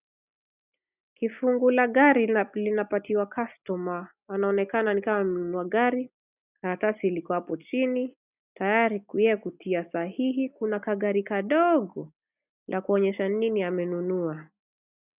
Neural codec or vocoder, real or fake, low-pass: none; real; 3.6 kHz